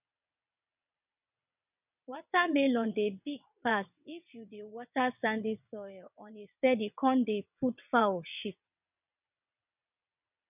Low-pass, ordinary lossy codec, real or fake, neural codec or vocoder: 3.6 kHz; none; fake; vocoder, 22.05 kHz, 80 mel bands, WaveNeXt